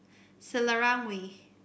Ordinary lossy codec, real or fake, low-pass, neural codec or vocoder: none; real; none; none